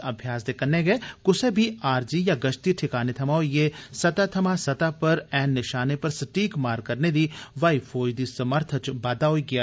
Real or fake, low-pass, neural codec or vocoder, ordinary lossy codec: real; none; none; none